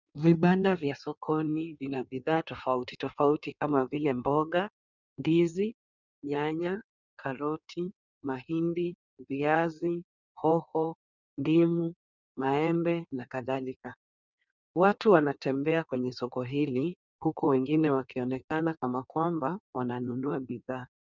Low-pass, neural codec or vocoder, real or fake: 7.2 kHz; codec, 16 kHz in and 24 kHz out, 1.1 kbps, FireRedTTS-2 codec; fake